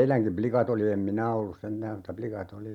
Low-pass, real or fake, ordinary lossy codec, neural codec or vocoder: 19.8 kHz; real; none; none